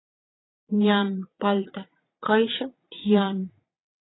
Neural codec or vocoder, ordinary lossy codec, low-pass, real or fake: vocoder, 22.05 kHz, 80 mel bands, Vocos; AAC, 16 kbps; 7.2 kHz; fake